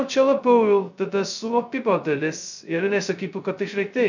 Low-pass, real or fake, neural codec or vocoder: 7.2 kHz; fake; codec, 16 kHz, 0.2 kbps, FocalCodec